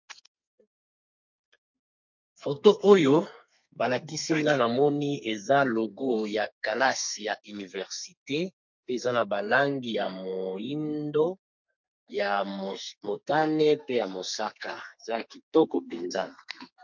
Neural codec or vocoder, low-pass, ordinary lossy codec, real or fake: codec, 32 kHz, 1.9 kbps, SNAC; 7.2 kHz; MP3, 48 kbps; fake